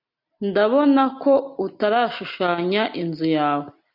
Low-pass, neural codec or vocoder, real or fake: 5.4 kHz; none; real